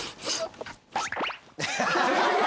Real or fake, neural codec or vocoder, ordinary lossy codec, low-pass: real; none; none; none